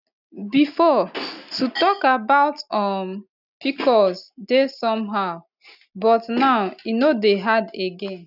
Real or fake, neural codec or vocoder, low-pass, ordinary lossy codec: real; none; 5.4 kHz; none